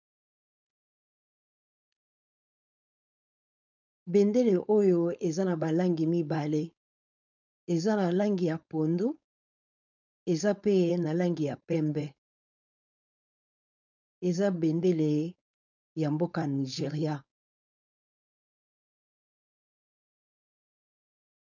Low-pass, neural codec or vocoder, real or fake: 7.2 kHz; codec, 16 kHz, 4.8 kbps, FACodec; fake